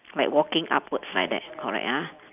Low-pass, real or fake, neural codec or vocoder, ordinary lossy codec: 3.6 kHz; real; none; none